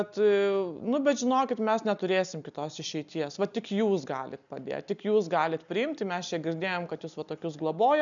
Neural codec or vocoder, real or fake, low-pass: none; real; 7.2 kHz